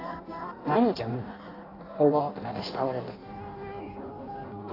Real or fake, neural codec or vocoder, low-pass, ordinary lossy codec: fake; codec, 16 kHz in and 24 kHz out, 0.6 kbps, FireRedTTS-2 codec; 5.4 kHz; AAC, 24 kbps